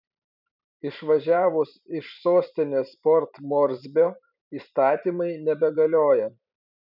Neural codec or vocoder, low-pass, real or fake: vocoder, 44.1 kHz, 128 mel bands every 256 samples, BigVGAN v2; 5.4 kHz; fake